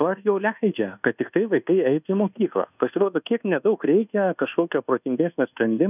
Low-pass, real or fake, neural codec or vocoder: 3.6 kHz; fake; codec, 24 kHz, 1.2 kbps, DualCodec